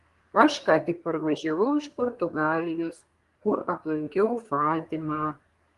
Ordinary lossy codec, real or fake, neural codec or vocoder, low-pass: Opus, 24 kbps; fake; codec, 24 kHz, 1 kbps, SNAC; 10.8 kHz